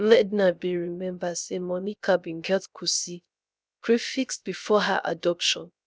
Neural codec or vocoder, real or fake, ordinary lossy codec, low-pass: codec, 16 kHz, 0.3 kbps, FocalCodec; fake; none; none